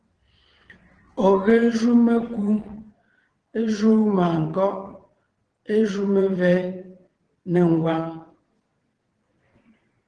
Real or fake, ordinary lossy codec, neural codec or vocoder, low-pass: fake; Opus, 16 kbps; vocoder, 22.05 kHz, 80 mel bands, WaveNeXt; 9.9 kHz